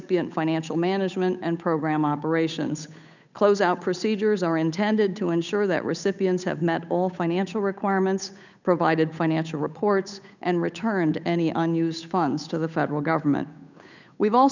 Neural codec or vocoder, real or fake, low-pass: codec, 16 kHz, 8 kbps, FunCodec, trained on Chinese and English, 25 frames a second; fake; 7.2 kHz